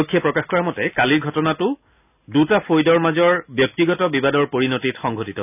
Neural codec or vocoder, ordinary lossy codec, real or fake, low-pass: none; none; real; 3.6 kHz